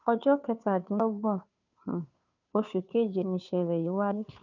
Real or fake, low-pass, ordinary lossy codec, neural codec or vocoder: fake; 7.2 kHz; none; codec, 16 kHz, 2 kbps, FunCodec, trained on Chinese and English, 25 frames a second